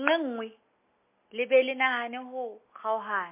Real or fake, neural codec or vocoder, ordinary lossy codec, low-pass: real; none; MP3, 24 kbps; 3.6 kHz